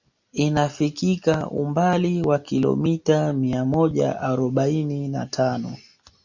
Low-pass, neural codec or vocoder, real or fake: 7.2 kHz; none; real